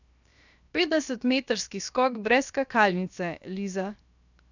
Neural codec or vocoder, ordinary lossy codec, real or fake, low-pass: codec, 16 kHz, 0.7 kbps, FocalCodec; none; fake; 7.2 kHz